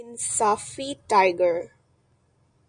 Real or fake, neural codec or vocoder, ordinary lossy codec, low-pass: real; none; MP3, 96 kbps; 9.9 kHz